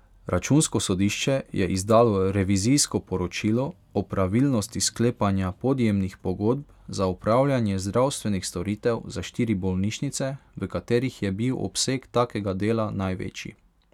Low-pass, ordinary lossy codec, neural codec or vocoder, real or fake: 19.8 kHz; none; none; real